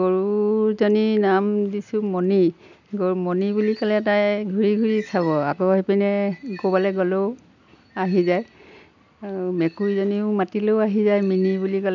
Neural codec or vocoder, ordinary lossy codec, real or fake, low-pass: none; none; real; 7.2 kHz